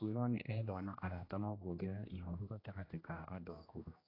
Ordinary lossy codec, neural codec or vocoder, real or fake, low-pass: AAC, 24 kbps; codec, 16 kHz, 1 kbps, X-Codec, HuBERT features, trained on general audio; fake; 5.4 kHz